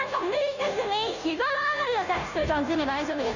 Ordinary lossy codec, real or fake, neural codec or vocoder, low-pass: none; fake; codec, 16 kHz, 0.5 kbps, FunCodec, trained on Chinese and English, 25 frames a second; 7.2 kHz